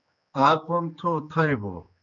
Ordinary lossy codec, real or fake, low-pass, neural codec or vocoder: MP3, 96 kbps; fake; 7.2 kHz; codec, 16 kHz, 2 kbps, X-Codec, HuBERT features, trained on general audio